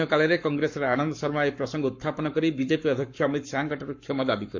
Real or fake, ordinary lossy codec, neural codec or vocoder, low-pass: fake; MP3, 48 kbps; codec, 44.1 kHz, 7.8 kbps, Pupu-Codec; 7.2 kHz